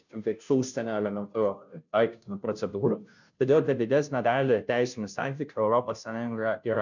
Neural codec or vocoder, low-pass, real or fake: codec, 16 kHz, 0.5 kbps, FunCodec, trained on Chinese and English, 25 frames a second; 7.2 kHz; fake